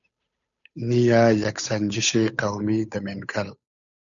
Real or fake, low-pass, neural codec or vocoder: fake; 7.2 kHz; codec, 16 kHz, 8 kbps, FunCodec, trained on Chinese and English, 25 frames a second